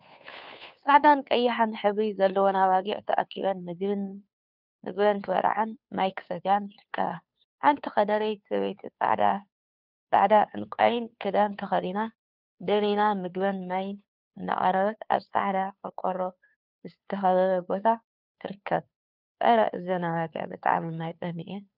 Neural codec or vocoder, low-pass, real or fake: codec, 16 kHz, 2 kbps, FunCodec, trained on Chinese and English, 25 frames a second; 5.4 kHz; fake